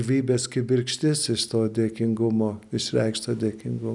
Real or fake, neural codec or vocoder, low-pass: real; none; 10.8 kHz